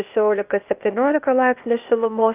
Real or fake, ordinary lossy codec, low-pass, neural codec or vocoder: fake; Opus, 24 kbps; 3.6 kHz; codec, 16 kHz, 0.8 kbps, ZipCodec